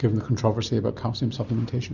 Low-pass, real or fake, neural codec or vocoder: 7.2 kHz; real; none